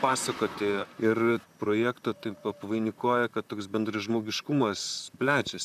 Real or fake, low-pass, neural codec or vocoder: fake; 14.4 kHz; vocoder, 44.1 kHz, 128 mel bands, Pupu-Vocoder